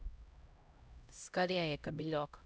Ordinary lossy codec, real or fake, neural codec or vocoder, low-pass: none; fake; codec, 16 kHz, 0.5 kbps, X-Codec, HuBERT features, trained on LibriSpeech; none